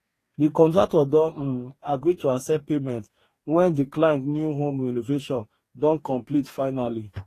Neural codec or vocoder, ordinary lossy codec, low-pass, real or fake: codec, 44.1 kHz, 2.6 kbps, DAC; AAC, 48 kbps; 14.4 kHz; fake